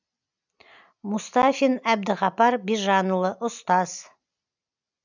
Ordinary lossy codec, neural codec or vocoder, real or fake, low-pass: none; none; real; 7.2 kHz